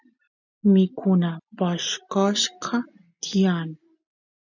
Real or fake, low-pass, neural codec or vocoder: real; 7.2 kHz; none